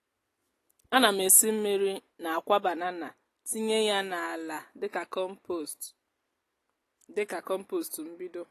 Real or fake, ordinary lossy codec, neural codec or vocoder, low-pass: real; AAC, 48 kbps; none; 14.4 kHz